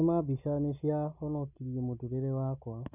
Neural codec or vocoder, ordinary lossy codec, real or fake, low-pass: none; none; real; 3.6 kHz